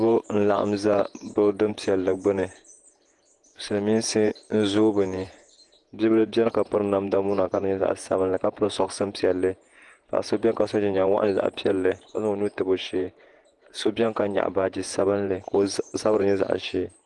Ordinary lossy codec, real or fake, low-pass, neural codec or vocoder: Opus, 24 kbps; fake; 10.8 kHz; vocoder, 48 kHz, 128 mel bands, Vocos